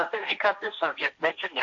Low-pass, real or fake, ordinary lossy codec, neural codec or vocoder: 7.2 kHz; fake; AAC, 48 kbps; codec, 16 kHz, 1.1 kbps, Voila-Tokenizer